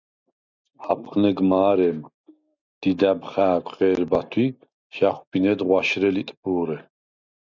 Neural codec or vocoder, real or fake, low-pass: none; real; 7.2 kHz